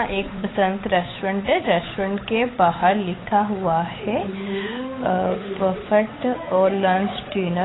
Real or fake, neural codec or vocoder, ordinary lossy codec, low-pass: fake; codec, 16 kHz, 8 kbps, FunCodec, trained on Chinese and English, 25 frames a second; AAC, 16 kbps; 7.2 kHz